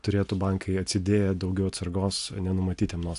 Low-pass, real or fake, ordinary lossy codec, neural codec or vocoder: 10.8 kHz; real; AAC, 64 kbps; none